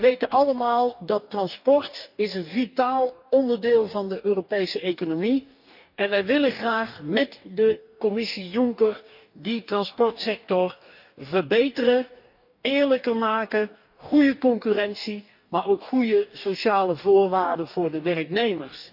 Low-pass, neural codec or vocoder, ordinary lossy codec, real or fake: 5.4 kHz; codec, 44.1 kHz, 2.6 kbps, DAC; none; fake